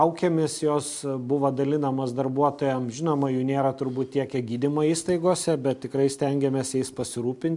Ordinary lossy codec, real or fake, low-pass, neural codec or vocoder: MP3, 64 kbps; real; 10.8 kHz; none